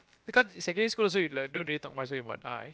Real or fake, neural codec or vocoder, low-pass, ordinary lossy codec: fake; codec, 16 kHz, about 1 kbps, DyCAST, with the encoder's durations; none; none